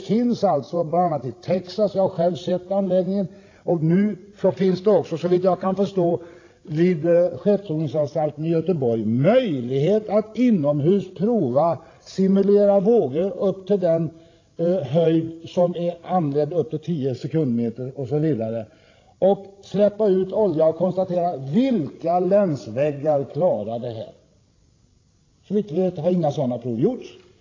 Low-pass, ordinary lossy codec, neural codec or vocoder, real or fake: 7.2 kHz; AAC, 32 kbps; codec, 16 kHz, 16 kbps, FreqCodec, larger model; fake